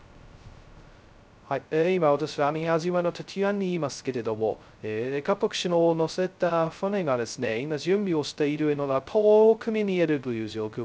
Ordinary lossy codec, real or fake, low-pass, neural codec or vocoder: none; fake; none; codec, 16 kHz, 0.2 kbps, FocalCodec